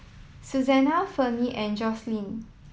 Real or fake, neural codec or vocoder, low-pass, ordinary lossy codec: real; none; none; none